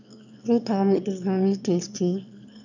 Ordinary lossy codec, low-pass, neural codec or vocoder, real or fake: none; 7.2 kHz; autoencoder, 22.05 kHz, a latent of 192 numbers a frame, VITS, trained on one speaker; fake